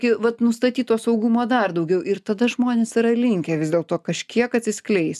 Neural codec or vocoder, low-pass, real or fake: none; 14.4 kHz; real